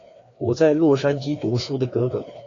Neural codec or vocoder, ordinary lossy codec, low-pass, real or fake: codec, 16 kHz, 2 kbps, FreqCodec, larger model; AAC, 32 kbps; 7.2 kHz; fake